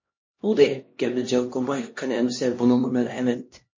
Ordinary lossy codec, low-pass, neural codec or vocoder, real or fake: MP3, 32 kbps; 7.2 kHz; codec, 16 kHz, 1 kbps, X-Codec, HuBERT features, trained on LibriSpeech; fake